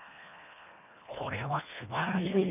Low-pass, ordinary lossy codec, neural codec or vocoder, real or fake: 3.6 kHz; none; codec, 24 kHz, 1.5 kbps, HILCodec; fake